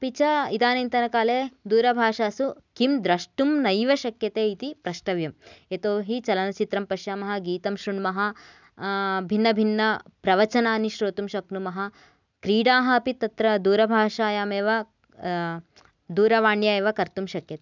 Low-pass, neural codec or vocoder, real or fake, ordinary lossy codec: 7.2 kHz; none; real; none